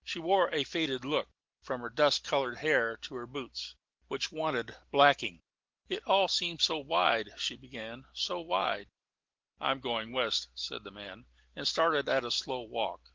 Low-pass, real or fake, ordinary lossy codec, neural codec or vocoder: 7.2 kHz; real; Opus, 16 kbps; none